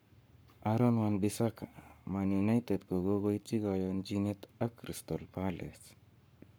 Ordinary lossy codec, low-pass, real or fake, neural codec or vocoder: none; none; fake; codec, 44.1 kHz, 7.8 kbps, Pupu-Codec